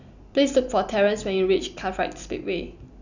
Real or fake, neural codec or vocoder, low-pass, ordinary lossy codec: real; none; 7.2 kHz; none